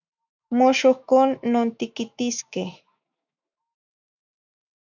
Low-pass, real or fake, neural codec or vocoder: 7.2 kHz; fake; autoencoder, 48 kHz, 128 numbers a frame, DAC-VAE, trained on Japanese speech